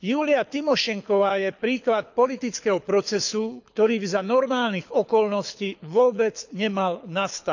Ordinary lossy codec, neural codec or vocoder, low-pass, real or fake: none; codec, 24 kHz, 6 kbps, HILCodec; 7.2 kHz; fake